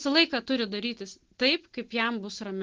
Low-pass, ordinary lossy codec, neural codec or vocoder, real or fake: 7.2 kHz; Opus, 16 kbps; none; real